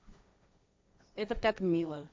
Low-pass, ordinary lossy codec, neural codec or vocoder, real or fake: none; none; codec, 16 kHz, 1.1 kbps, Voila-Tokenizer; fake